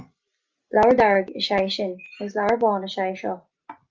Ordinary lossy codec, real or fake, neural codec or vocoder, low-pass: Opus, 32 kbps; real; none; 7.2 kHz